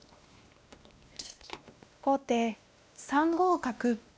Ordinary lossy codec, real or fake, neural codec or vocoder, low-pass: none; fake; codec, 16 kHz, 1 kbps, X-Codec, WavLM features, trained on Multilingual LibriSpeech; none